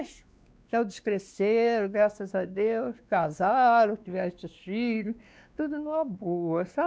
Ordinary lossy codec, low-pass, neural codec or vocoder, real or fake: none; none; codec, 16 kHz, 2 kbps, X-Codec, WavLM features, trained on Multilingual LibriSpeech; fake